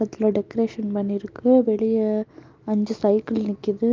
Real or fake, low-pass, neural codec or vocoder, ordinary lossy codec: real; 7.2 kHz; none; Opus, 32 kbps